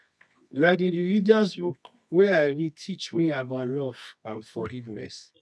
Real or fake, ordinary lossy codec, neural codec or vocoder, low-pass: fake; none; codec, 24 kHz, 0.9 kbps, WavTokenizer, medium music audio release; none